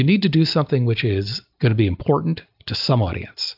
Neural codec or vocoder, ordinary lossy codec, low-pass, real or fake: none; AAC, 48 kbps; 5.4 kHz; real